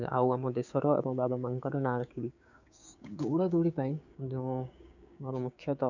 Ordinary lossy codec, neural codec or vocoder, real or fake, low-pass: none; codec, 16 kHz, 6 kbps, DAC; fake; 7.2 kHz